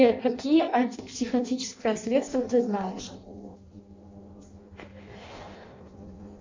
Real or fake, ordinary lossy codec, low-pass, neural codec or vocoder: fake; MP3, 64 kbps; 7.2 kHz; codec, 16 kHz in and 24 kHz out, 0.6 kbps, FireRedTTS-2 codec